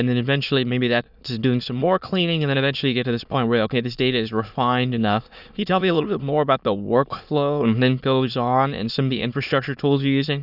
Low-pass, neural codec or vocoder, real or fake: 5.4 kHz; autoencoder, 22.05 kHz, a latent of 192 numbers a frame, VITS, trained on many speakers; fake